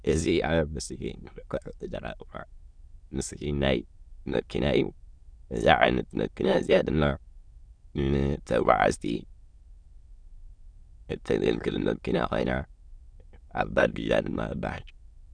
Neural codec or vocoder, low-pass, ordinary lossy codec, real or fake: autoencoder, 22.05 kHz, a latent of 192 numbers a frame, VITS, trained on many speakers; 9.9 kHz; Opus, 64 kbps; fake